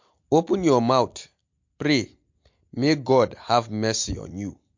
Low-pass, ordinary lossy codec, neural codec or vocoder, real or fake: 7.2 kHz; MP3, 48 kbps; none; real